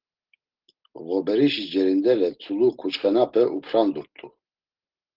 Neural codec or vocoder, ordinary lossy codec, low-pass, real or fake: none; Opus, 16 kbps; 5.4 kHz; real